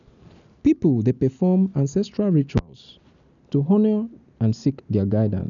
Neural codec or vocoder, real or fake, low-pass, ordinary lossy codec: none; real; 7.2 kHz; none